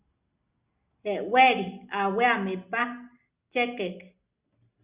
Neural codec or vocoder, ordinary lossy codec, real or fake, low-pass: none; Opus, 64 kbps; real; 3.6 kHz